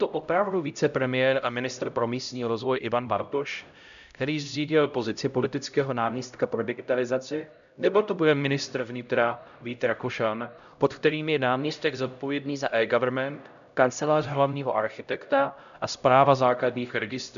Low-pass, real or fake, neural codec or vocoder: 7.2 kHz; fake; codec, 16 kHz, 0.5 kbps, X-Codec, HuBERT features, trained on LibriSpeech